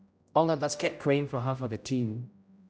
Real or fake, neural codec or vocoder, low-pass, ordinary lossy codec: fake; codec, 16 kHz, 0.5 kbps, X-Codec, HuBERT features, trained on general audio; none; none